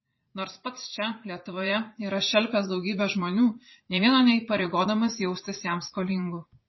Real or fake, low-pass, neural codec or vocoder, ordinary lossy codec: fake; 7.2 kHz; vocoder, 44.1 kHz, 80 mel bands, Vocos; MP3, 24 kbps